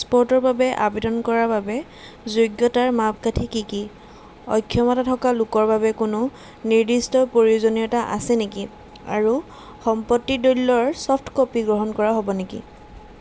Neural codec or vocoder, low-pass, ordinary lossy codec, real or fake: none; none; none; real